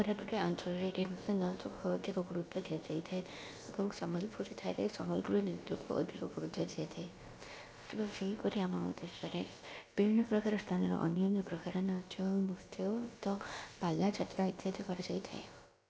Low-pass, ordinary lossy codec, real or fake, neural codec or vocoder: none; none; fake; codec, 16 kHz, about 1 kbps, DyCAST, with the encoder's durations